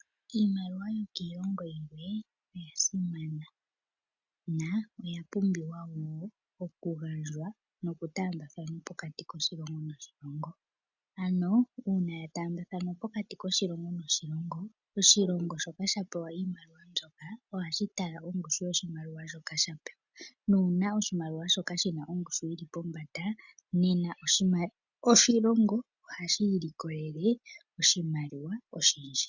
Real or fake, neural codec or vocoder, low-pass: real; none; 7.2 kHz